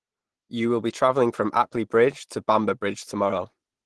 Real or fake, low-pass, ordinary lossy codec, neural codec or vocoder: real; 10.8 kHz; Opus, 16 kbps; none